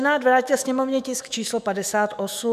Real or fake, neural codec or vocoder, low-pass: fake; autoencoder, 48 kHz, 128 numbers a frame, DAC-VAE, trained on Japanese speech; 14.4 kHz